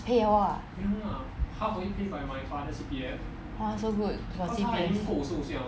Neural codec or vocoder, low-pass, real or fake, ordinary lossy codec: none; none; real; none